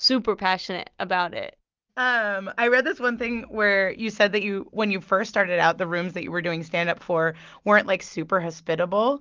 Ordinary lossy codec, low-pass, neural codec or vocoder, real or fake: Opus, 32 kbps; 7.2 kHz; none; real